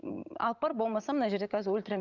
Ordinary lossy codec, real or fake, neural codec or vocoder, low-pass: Opus, 24 kbps; fake; vocoder, 44.1 kHz, 128 mel bands every 512 samples, BigVGAN v2; 7.2 kHz